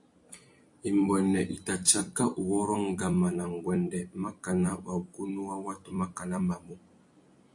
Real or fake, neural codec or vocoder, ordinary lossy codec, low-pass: fake; vocoder, 44.1 kHz, 128 mel bands every 256 samples, BigVGAN v2; AAC, 64 kbps; 10.8 kHz